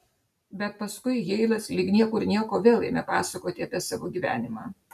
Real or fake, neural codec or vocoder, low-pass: real; none; 14.4 kHz